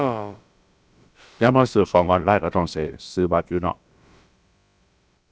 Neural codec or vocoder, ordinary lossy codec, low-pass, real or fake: codec, 16 kHz, about 1 kbps, DyCAST, with the encoder's durations; none; none; fake